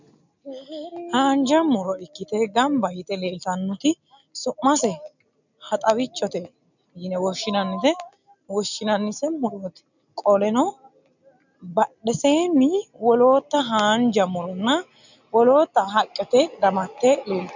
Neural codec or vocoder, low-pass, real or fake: none; 7.2 kHz; real